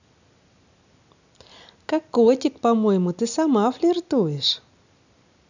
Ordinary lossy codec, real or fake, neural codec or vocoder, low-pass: none; real; none; 7.2 kHz